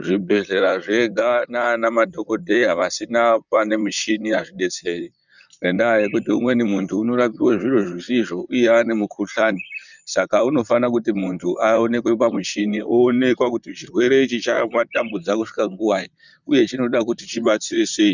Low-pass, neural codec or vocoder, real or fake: 7.2 kHz; vocoder, 44.1 kHz, 128 mel bands, Pupu-Vocoder; fake